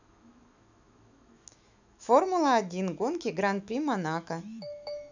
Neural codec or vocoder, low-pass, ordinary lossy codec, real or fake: autoencoder, 48 kHz, 128 numbers a frame, DAC-VAE, trained on Japanese speech; 7.2 kHz; none; fake